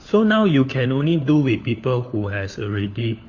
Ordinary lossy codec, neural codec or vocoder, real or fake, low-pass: AAC, 48 kbps; codec, 16 kHz, 4 kbps, FunCodec, trained on LibriTTS, 50 frames a second; fake; 7.2 kHz